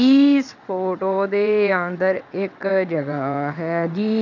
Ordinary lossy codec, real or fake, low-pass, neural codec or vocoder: none; fake; 7.2 kHz; vocoder, 22.05 kHz, 80 mel bands, WaveNeXt